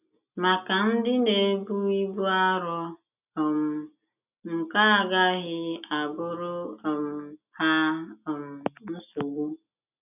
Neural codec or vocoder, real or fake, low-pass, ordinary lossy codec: none; real; 3.6 kHz; none